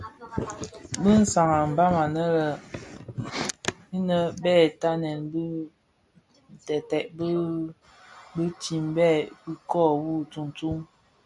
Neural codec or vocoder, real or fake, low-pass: none; real; 10.8 kHz